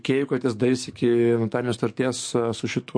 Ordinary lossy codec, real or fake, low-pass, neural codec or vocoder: MP3, 48 kbps; fake; 9.9 kHz; codec, 44.1 kHz, 7.8 kbps, DAC